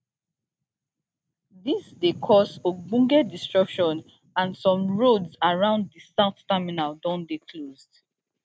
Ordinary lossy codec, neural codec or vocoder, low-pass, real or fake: none; none; none; real